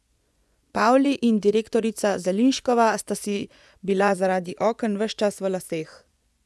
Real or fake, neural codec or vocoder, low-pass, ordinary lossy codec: fake; vocoder, 24 kHz, 100 mel bands, Vocos; none; none